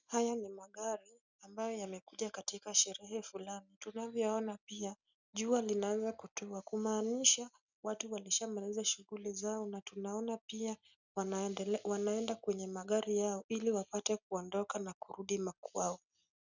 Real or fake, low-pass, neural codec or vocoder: real; 7.2 kHz; none